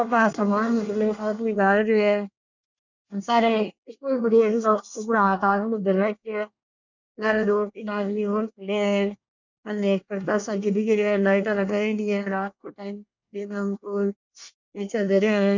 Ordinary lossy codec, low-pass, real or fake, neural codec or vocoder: none; 7.2 kHz; fake; codec, 24 kHz, 1 kbps, SNAC